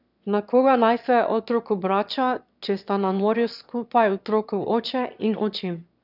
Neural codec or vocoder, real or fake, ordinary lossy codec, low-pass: autoencoder, 22.05 kHz, a latent of 192 numbers a frame, VITS, trained on one speaker; fake; none; 5.4 kHz